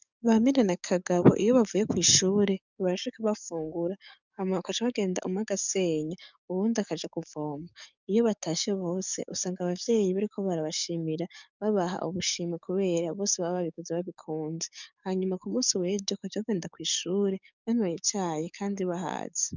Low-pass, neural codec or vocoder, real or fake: 7.2 kHz; codec, 44.1 kHz, 7.8 kbps, DAC; fake